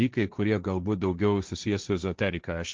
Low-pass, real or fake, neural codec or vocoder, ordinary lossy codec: 7.2 kHz; fake; codec, 16 kHz, 1.1 kbps, Voila-Tokenizer; Opus, 24 kbps